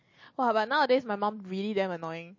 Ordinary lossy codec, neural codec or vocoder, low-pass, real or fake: MP3, 32 kbps; none; 7.2 kHz; real